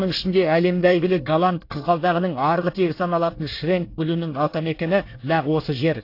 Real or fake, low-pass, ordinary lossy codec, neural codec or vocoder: fake; 5.4 kHz; AAC, 32 kbps; codec, 24 kHz, 1 kbps, SNAC